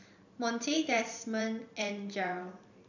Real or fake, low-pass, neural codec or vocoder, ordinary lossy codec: fake; 7.2 kHz; vocoder, 44.1 kHz, 128 mel bands every 512 samples, BigVGAN v2; none